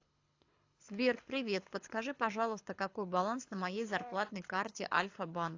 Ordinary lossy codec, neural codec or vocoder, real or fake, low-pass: AAC, 48 kbps; codec, 24 kHz, 6 kbps, HILCodec; fake; 7.2 kHz